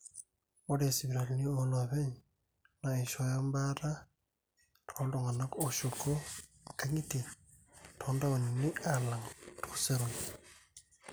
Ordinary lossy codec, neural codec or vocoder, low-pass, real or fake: none; none; none; real